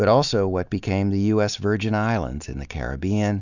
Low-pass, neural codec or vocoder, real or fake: 7.2 kHz; none; real